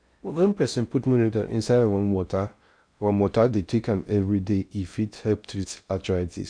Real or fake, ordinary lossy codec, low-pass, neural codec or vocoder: fake; none; 9.9 kHz; codec, 16 kHz in and 24 kHz out, 0.6 kbps, FocalCodec, streaming, 4096 codes